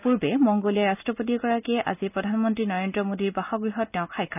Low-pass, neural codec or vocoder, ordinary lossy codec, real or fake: 3.6 kHz; none; none; real